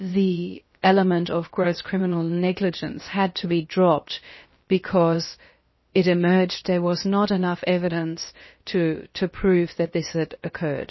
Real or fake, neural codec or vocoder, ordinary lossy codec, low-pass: fake; codec, 16 kHz, about 1 kbps, DyCAST, with the encoder's durations; MP3, 24 kbps; 7.2 kHz